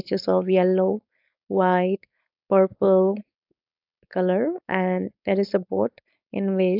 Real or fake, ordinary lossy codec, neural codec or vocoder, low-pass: fake; AAC, 48 kbps; codec, 16 kHz, 4.8 kbps, FACodec; 5.4 kHz